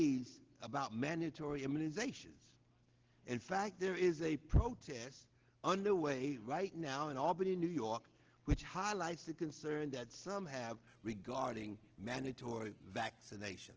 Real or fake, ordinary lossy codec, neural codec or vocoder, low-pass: real; Opus, 16 kbps; none; 7.2 kHz